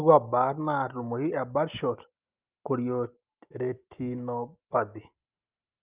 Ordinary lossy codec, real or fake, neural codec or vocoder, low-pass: Opus, 24 kbps; real; none; 3.6 kHz